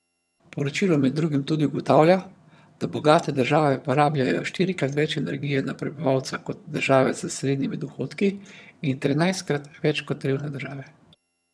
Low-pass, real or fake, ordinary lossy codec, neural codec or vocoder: none; fake; none; vocoder, 22.05 kHz, 80 mel bands, HiFi-GAN